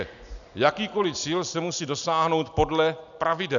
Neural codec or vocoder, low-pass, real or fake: none; 7.2 kHz; real